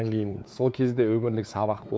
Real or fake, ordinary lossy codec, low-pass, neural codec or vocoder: fake; none; none; codec, 16 kHz, 4 kbps, X-Codec, WavLM features, trained on Multilingual LibriSpeech